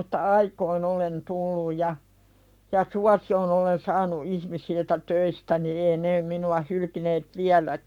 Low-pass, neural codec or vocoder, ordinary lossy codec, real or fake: 19.8 kHz; codec, 44.1 kHz, 7.8 kbps, Pupu-Codec; none; fake